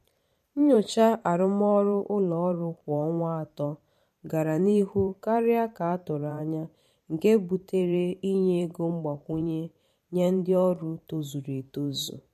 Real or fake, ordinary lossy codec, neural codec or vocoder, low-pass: fake; MP3, 64 kbps; vocoder, 44.1 kHz, 128 mel bands every 512 samples, BigVGAN v2; 14.4 kHz